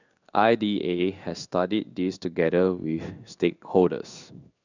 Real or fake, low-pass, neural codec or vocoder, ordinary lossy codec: fake; 7.2 kHz; codec, 16 kHz in and 24 kHz out, 1 kbps, XY-Tokenizer; none